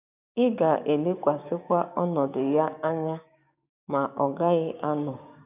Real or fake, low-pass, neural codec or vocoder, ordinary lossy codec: fake; 3.6 kHz; codec, 16 kHz, 6 kbps, DAC; none